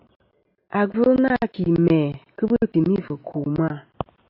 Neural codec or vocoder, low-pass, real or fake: none; 5.4 kHz; real